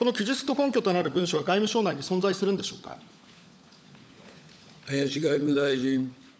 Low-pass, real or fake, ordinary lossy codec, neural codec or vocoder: none; fake; none; codec, 16 kHz, 16 kbps, FunCodec, trained on LibriTTS, 50 frames a second